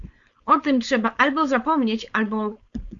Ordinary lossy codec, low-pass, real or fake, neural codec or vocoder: Opus, 64 kbps; 7.2 kHz; fake; codec, 16 kHz, 4.8 kbps, FACodec